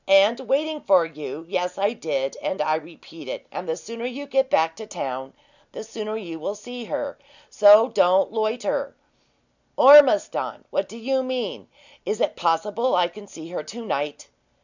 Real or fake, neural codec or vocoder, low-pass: real; none; 7.2 kHz